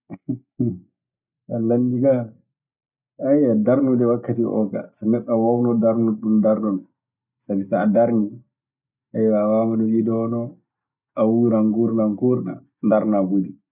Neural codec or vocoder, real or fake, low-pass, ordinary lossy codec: none; real; 3.6 kHz; none